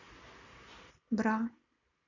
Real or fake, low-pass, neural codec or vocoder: real; 7.2 kHz; none